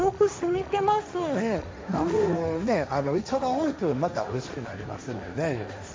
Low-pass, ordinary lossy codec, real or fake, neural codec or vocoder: none; none; fake; codec, 16 kHz, 1.1 kbps, Voila-Tokenizer